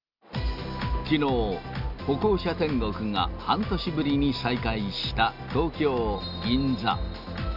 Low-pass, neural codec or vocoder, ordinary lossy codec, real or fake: 5.4 kHz; none; none; real